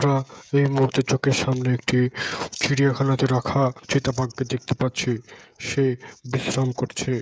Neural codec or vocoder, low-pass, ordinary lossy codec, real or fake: codec, 16 kHz, 16 kbps, FreqCodec, smaller model; none; none; fake